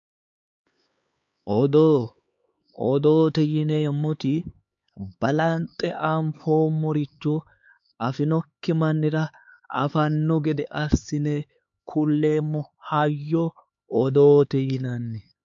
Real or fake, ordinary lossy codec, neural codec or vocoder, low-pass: fake; MP3, 48 kbps; codec, 16 kHz, 4 kbps, X-Codec, HuBERT features, trained on LibriSpeech; 7.2 kHz